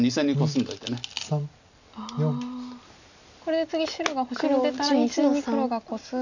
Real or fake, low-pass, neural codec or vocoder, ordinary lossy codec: real; 7.2 kHz; none; none